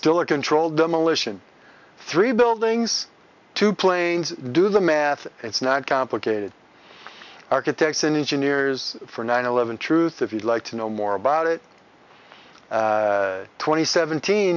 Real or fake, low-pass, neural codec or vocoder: real; 7.2 kHz; none